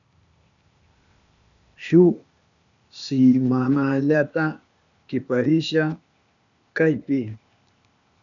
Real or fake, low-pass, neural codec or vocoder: fake; 7.2 kHz; codec, 16 kHz, 0.8 kbps, ZipCodec